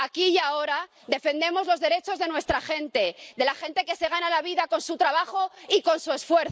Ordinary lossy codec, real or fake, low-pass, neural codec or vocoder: none; real; none; none